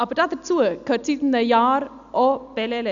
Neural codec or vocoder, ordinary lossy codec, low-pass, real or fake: none; none; 7.2 kHz; real